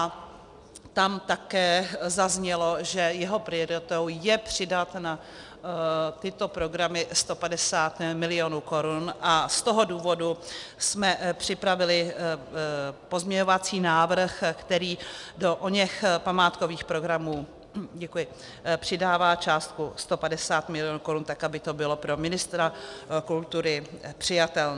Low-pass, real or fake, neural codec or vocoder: 10.8 kHz; real; none